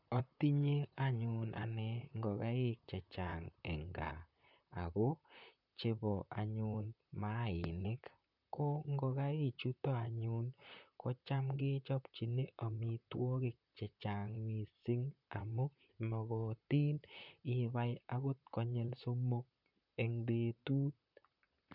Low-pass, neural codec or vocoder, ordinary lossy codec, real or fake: 5.4 kHz; vocoder, 44.1 kHz, 128 mel bands, Pupu-Vocoder; none; fake